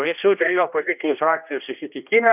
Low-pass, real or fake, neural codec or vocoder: 3.6 kHz; fake; codec, 16 kHz, 1 kbps, X-Codec, HuBERT features, trained on general audio